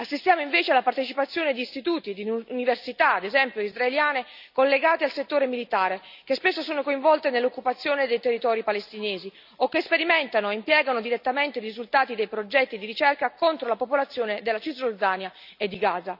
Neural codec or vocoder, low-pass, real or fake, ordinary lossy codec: none; 5.4 kHz; real; none